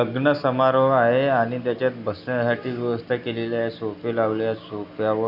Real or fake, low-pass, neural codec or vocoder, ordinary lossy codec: real; 5.4 kHz; none; none